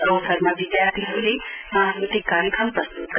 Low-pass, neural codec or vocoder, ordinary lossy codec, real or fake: 3.6 kHz; none; none; real